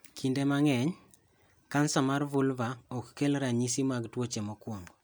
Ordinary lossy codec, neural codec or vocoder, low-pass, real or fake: none; none; none; real